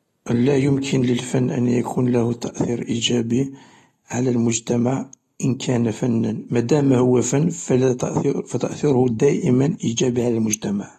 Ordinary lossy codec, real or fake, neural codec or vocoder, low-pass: AAC, 32 kbps; real; none; 19.8 kHz